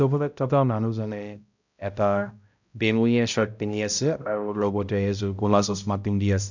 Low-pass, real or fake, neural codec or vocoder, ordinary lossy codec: 7.2 kHz; fake; codec, 16 kHz, 0.5 kbps, X-Codec, HuBERT features, trained on balanced general audio; none